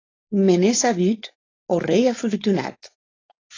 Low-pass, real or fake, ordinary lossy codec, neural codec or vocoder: 7.2 kHz; fake; AAC, 32 kbps; codec, 16 kHz, 4.8 kbps, FACodec